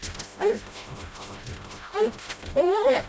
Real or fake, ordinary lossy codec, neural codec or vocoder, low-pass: fake; none; codec, 16 kHz, 0.5 kbps, FreqCodec, smaller model; none